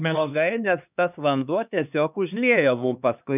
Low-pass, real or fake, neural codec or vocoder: 3.6 kHz; fake; codec, 16 kHz, 2 kbps, X-Codec, HuBERT features, trained on LibriSpeech